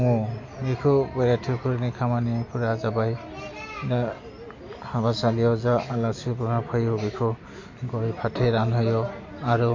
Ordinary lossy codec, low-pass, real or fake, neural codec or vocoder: MP3, 48 kbps; 7.2 kHz; real; none